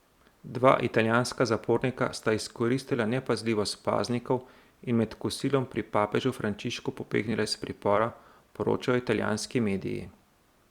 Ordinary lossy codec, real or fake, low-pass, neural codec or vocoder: Opus, 64 kbps; fake; 19.8 kHz; vocoder, 44.1 kHz, 128 mel bands every 256 samples, BigVGAN v2